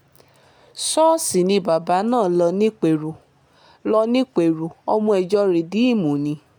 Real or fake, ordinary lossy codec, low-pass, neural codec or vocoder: real; none; 19.8 kHz; none